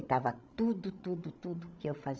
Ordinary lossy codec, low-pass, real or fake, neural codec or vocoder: none; none; fake; codec, 16 kHz, 16 kbps, FreqCodec, larger model